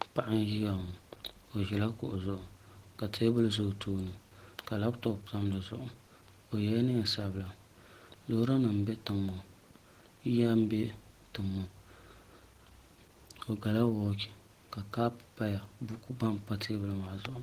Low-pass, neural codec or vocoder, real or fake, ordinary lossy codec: 14.4 kHz; none; real; Opus, 16 kbps